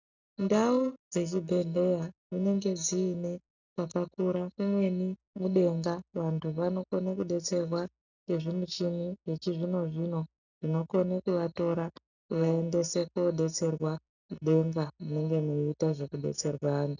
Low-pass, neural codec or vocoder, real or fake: 7.2 kHz; none; real